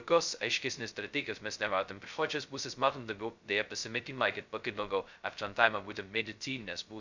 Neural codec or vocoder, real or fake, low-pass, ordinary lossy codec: codec, 16 kHz, 0.2 kbps, FocalCodec; fake; 7.2 kHz; Opus, 64 kbps